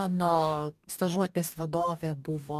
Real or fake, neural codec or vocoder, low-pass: fake; codec, 44.1 kHz, 2.6 kbps, DAC; 14.4 kHz